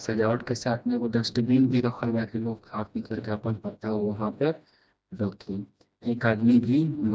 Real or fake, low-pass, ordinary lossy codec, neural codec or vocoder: fake; none; none; codec, 16 kHz, 1 kbps, FreqCodec, smaller model